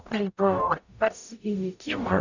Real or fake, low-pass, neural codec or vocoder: fake; 7.2 kHz; codec, 44.1 kHz, 0.9 kbps, DAC